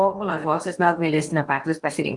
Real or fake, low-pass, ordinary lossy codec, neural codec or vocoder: fake; 10.8 kHz; Opus, 32 kbps; codec, 16 kHz in and 24 kHz out, 0.8 kbps, FocalCodec, streaming, 65536 codes